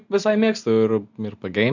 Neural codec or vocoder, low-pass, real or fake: none; 7.2 kHz; real